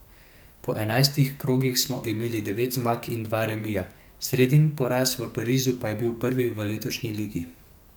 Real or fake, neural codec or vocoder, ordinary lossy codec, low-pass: fake; codec, 44.1 kHz, 2.6 kbps, SNAC; none; none